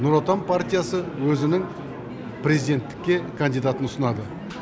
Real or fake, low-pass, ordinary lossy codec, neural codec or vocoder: real; none; none; none